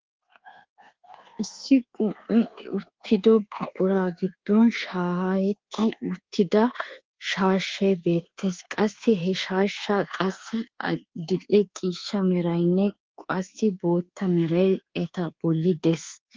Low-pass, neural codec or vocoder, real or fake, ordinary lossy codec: 7.2 kHz; codec, 24 kHz, 1.2 kbps, DualCodec; fake; Opus, 16 kbps